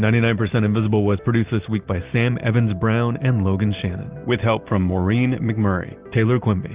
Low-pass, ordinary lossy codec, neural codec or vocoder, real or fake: 3.6 kHz; Opus, 32 kbps; none; real